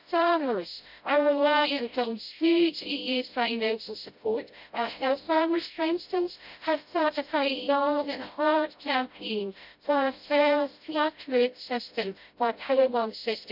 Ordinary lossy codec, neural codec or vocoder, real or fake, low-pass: none; codec, 16 kHz, 0.5 kbps, FreqCodec, smaller model; fake; 5.4 kHz